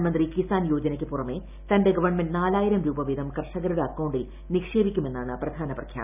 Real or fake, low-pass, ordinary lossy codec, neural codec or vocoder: real; 3.6 kHz; none; none